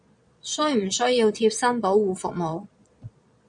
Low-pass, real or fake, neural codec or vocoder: 9.9 kHz; fake; vocoder, 22.05 kHz, 80 mel bands, Vocos